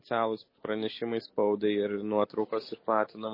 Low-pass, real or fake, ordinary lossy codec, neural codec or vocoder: 5.4 kHz; real; MP3, 24 kbps; none